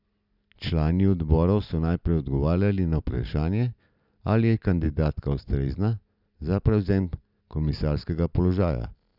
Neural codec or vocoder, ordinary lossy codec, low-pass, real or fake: none; none; 5.4 kHz; real